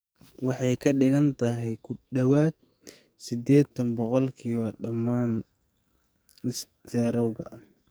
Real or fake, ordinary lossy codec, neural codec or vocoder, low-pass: fake; none; codec, 44.1 kHz, 2.6 kbps, SNAC; none